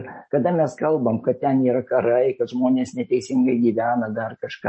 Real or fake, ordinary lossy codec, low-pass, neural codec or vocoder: real; MP3, 32 kbps; 9.9 kHz; none